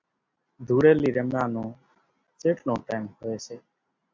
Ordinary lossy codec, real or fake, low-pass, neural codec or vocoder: MP3, 64 kbps; real; 7.2 kHz; none